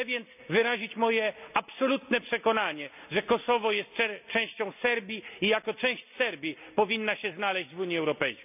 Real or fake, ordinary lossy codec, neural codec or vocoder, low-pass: real; none; none; 3.6 kHz